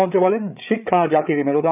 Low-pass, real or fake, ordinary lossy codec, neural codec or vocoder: 3.6 kHz; fake; none; codec, 16 kHz, 8 kbps, FreqCodec, larger model